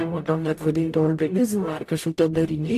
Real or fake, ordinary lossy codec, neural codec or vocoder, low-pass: fake; AAC, 64 kbps; codec, 44.1 kHz, 0.9 kbps, DAC; 14.4 kHz